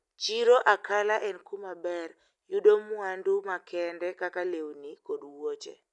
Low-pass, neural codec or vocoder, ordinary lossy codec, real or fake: 10.8 kHz; none; none; real